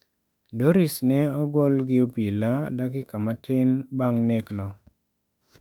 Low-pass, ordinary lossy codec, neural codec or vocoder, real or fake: 19.8 kHz; none; autoencoder, 48 kHz, 32 numbers a frame, DAC-VAE, trained on Japanese speech; fake